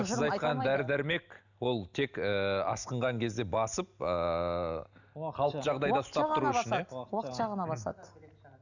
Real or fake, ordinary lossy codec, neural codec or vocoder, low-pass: real; none; none; 7.2 kHz